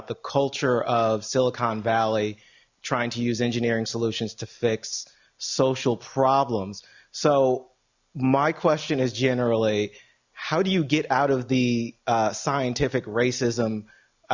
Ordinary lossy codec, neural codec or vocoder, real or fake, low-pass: Opus, 64 kbps; none; real; 7.2 kHz